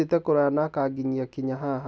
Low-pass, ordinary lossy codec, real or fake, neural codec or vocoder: none; none; real; none